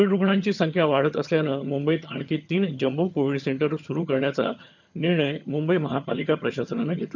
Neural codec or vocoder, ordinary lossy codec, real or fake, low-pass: vocoder, 22.05 kHz, 80 mel bands, HiFi-GAN; none; fake; 7.2 kHz